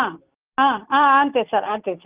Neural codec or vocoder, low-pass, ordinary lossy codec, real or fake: none; 3.6 kHz; Opus, 24 kbps; real